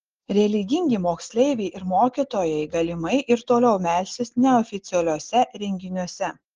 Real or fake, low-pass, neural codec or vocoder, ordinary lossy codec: real; 7.2 kHz; none; Opus, 16 kbps